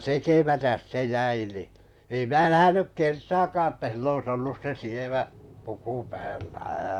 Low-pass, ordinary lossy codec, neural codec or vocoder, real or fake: 19.8 kHz; none; vocoder, 44.1 kHz, 128 mel bands, Pupu-Vocoder; fake